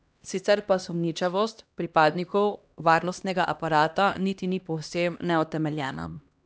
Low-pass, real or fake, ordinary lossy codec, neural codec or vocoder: none; fake; none; codec, 16 kHz, 1 kbps, X-Codec, HuBERT features, trained on LibriSpeech